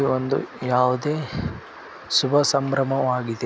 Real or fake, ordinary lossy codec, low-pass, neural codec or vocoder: real; none; none; none